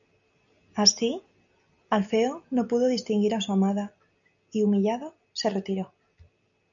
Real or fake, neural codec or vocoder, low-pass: real; none; 7.2 kHz